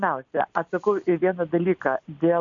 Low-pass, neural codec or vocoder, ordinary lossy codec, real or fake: 7.2 kHz; none; AAC, 64 kbps; real